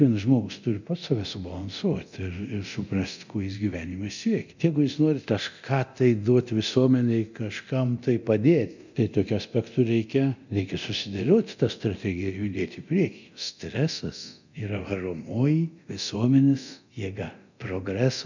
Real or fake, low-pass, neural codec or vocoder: fake; 7.2 kHz; codec, 24 kHz, 0.9 kbps, DualCodec